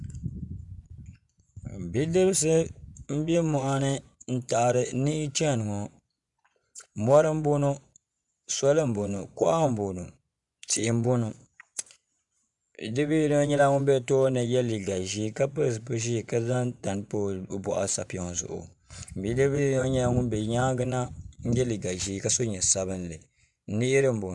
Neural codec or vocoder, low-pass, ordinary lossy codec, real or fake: vocoder, 24 kHz, 100 mel bands, Vocos; 10.8 kHz; MP3, 96 kbps; fake